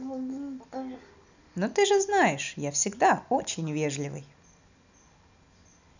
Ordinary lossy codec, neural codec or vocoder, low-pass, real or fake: none; none; 7.2 kHz; real